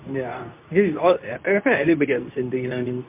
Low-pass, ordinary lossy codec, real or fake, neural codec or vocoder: 3.6 kHz; MP3, 32 kbps; fake; codec, 24 kHz, 0.9 kbps, WavTokenizer, medium speech release version 2